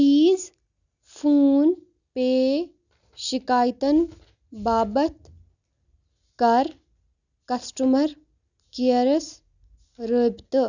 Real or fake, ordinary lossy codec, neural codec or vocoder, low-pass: real; none; none; 7.2 kHz